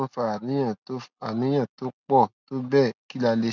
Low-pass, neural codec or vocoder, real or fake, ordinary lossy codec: 7.2 kHz; none; real; none